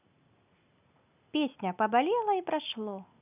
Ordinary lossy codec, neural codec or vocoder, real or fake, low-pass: none; none; real; 3.6 kHz